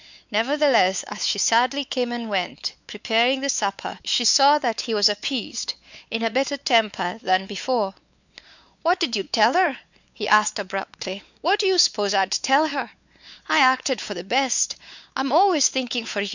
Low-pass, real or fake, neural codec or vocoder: 7.2 kHz; fake; codec, 16 kHz, 4 kbps, X-Codec, WavLM features, trained on Multilingual LibriSpeech